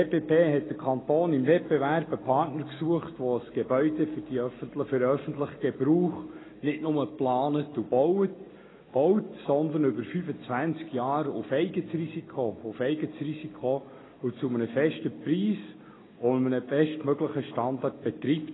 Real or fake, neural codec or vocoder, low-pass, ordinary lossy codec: real; none; 7.2 kHz; AAC, 16 kbps